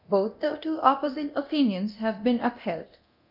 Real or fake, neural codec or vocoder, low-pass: fake; codec, 24 kHz, 0.9 kbps, DualCodec; 5.4 kHz